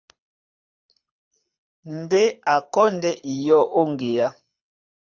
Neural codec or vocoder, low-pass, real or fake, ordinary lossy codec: codec, 24 kHz, 6 kbps, HILCodec; 7.2 kHz; fake; Opus, 64 kbps